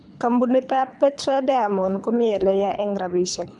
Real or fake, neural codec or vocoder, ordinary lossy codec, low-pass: fake; codec, 24 kHz, 3 kbps, HILCodec; none; 10.8 kHz